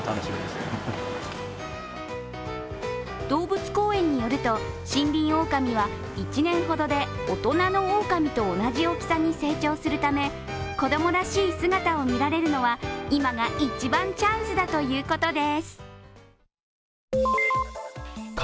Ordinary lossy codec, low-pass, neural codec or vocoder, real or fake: none; none; none; real